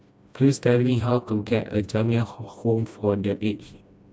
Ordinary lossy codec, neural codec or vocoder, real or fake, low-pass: none; codec, 16 kHz, 1 kbps, FreqCodec, smaller model; fake; none